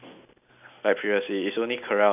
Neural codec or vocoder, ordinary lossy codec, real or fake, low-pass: none; none; real; 3.6 kHz